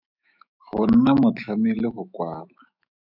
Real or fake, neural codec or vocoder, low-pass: fake; autoencoder, 48 kHz, 128 numbers a frame, DAC-VAE, trained on Japanese speech; 5.4 kHz